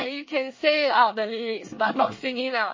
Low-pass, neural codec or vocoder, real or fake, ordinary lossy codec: 7.2 kHz; codec, 24 kHz, 1 kbps, SNAC; fake; MP3, 32 kbps